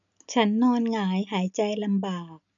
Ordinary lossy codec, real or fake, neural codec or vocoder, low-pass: none; real; none; 7.2 kHz